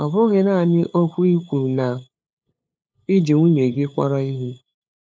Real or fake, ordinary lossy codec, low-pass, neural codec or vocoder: fake; none; none; codec, 16 kHz, 8 kbps, FunCodec, trained on LibriTTS, 25 frames a second